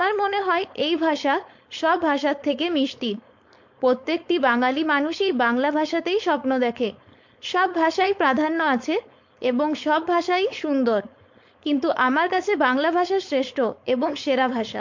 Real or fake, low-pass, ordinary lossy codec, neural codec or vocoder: fake; 7.2 kHz; MP3, 48 kbps; codec, 16 kHz, 4.8 kbps, FACodec